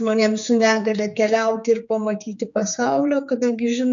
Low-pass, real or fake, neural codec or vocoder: 7.2 kHz; fake; codec, 16 kHz, 4 kbps, X-Codec, HuBERT features, trained on general audio